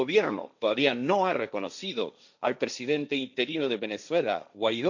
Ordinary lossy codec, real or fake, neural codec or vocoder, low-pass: none; fake; codec, 16 kHz, 1.1 kbps, Voila-Tokenizer; 7.2 kHz